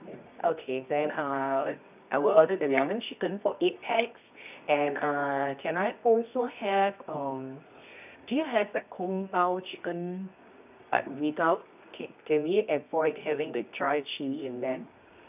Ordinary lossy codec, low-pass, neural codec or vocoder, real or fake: none; 3.6 kHz; codec, 24 kHz, 0.9 kbps, WavTokenizer, medium music audio release; fake